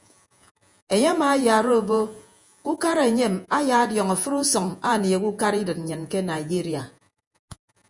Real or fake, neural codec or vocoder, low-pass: fake; vocoder, 48 kHz, 128 mel bands, Vocos; 10.8 kHz